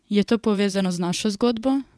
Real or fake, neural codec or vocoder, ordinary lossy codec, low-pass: fake; vocoder, 22.05 kHz, 80 mel bands, WaveNeXt; none; none